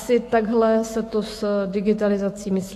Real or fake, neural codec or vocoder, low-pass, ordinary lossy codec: fake; autoencoder, 48 kHz, 128 numbers a frame, DAC-VAE, trained on Japanese speech; 14.4 kHz; AAC, 48 kbps